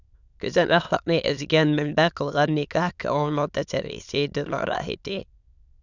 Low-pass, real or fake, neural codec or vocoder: 7.2 kHz; fake; autoencoder, 22.05 kHz, a latent of 192 numbers a frame, VITS, trained on many speakers